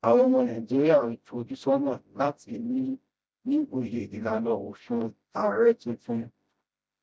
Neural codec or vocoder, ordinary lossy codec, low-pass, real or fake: codec, 16 kHz, 0.5 kbps, FreqCodec, smaller model; none; none; fake